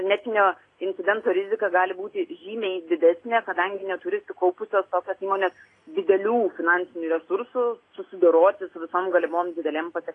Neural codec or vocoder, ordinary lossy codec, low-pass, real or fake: none; AAC, 32 kbps; 9.9 kHz; real